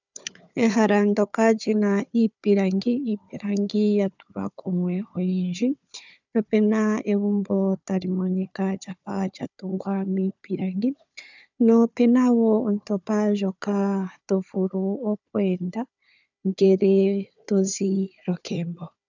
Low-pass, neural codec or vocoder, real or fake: 7.2 kHz; codec, 16 kHz, 4 kbps, FunCodec, trained on Chinese and English, 50 frames a second; fake